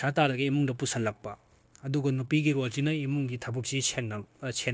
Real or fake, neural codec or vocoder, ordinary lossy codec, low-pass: fake; codec, 16 kHz, 0.9 kbps, LongCat-Audio-Codec; none; none